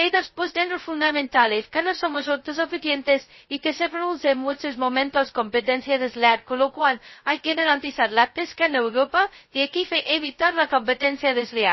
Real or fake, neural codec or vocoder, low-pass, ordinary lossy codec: fake; codec, 16 kHz, 0.2 kbps, FocalCodec; 7.2 kHz; MP3, 24 kbps